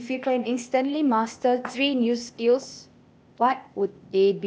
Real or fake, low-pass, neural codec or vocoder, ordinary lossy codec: fake; none; codec, 16 kHz, 0.8 kbps, ZipCodec; none